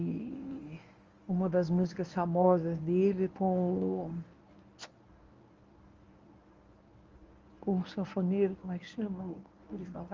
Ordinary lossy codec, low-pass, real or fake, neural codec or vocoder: Opus, 32 kbps; 7.2 kHz; fake; codec, 24 kHz, 0.9 kbps, WavTokenizer, medium speech release version 1